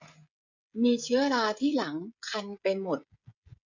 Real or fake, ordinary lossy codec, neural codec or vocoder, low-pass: fake; none; codec, 16 kHz, 16 kbps, FreqCodec, smaller model; 7.2 kHz